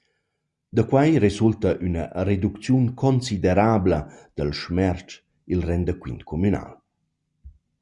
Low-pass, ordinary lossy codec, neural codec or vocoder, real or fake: 10.8 kHz; Opus, 64 kbps; none; real